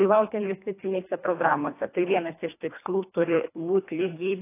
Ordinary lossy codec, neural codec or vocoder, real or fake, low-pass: AAC, 24 kbps; codec, 24 kHz, 1.5 kbps, HILCodec; fake; 3.6 kHz